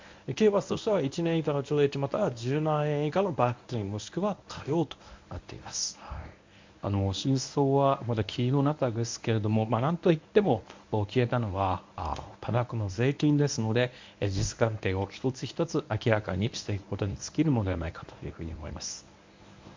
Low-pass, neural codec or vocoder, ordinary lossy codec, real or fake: 7.2 kHz; codec, 24 kHz, 0.9 kbps, WavTokenizer, medium speech release version 1; MP3, 64 kbps; fake